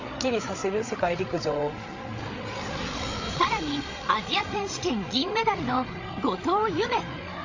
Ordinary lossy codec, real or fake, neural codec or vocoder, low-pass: AAC, 48 kbps; fake; codec, 16 kHz, 8 kbps, FreqCodec, larger model; 7.2 kHz